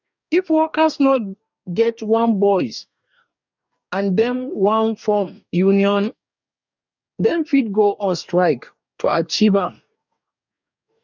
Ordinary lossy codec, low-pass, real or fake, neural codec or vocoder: none; 7.2 kHz; fake; codec, 44.1 kHz, 2.6 kbps, DAC